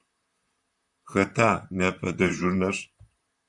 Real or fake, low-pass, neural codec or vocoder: fake; 10.8 kHz; vocoder, 44.1 kHz, 128 mel bands, Pupu-Vocoder